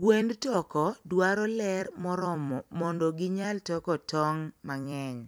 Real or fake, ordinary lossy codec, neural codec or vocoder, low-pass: fake; none; vocoder, 44.1 kHz, 128 mel bands every 256 samples, BigVGAN v2; none